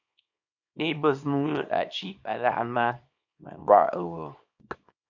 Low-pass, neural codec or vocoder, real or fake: 7.2 kHz; codec, 24 kHz, 0.9 kbps, WavTokenizer, small release; fake